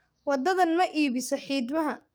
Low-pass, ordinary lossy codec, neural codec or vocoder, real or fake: none; none; codec, 44.1 kHz, 7.8 kbps, DAC; fake